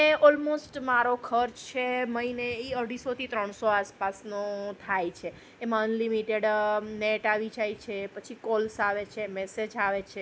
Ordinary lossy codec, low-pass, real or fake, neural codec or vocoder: none; none; real; none